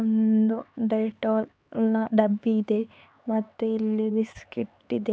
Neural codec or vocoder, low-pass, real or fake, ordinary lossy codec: codec, 16 kHz, 4 kbps, X-Codec, HuBERT features, trained on LibriSpeech; none; fake; none